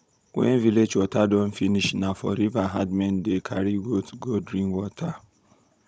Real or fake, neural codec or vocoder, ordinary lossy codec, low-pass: fake; codec, 16 kHz, 16 kbps, FunCodec, trained on Chinese and English, 50 frames a second; none; none